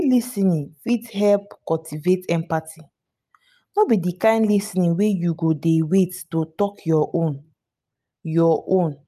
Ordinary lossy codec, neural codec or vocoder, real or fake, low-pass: none; none; real; 14.4 kHz